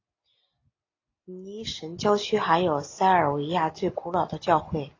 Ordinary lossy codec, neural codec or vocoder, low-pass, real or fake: AAC, 32 kbps; none; 7.2 kHz; real